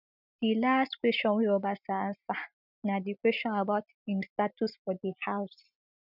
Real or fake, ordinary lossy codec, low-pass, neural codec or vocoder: real; none; 5.4 kHz; none